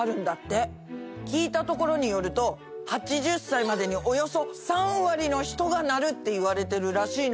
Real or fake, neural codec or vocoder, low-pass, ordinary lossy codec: real; none; none; none